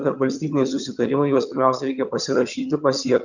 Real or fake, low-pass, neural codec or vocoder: fake; 7.2 kHz; vocoder, 22.05 kHz, 80 mel bands, HiFi-GAN